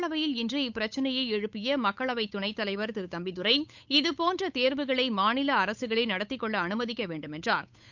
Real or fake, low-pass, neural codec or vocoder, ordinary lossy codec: fake; 7.2 kHz; codec, 16 kHz, 8 kbps, FunCodec, trained on Chinese and English, 25 frames a second; none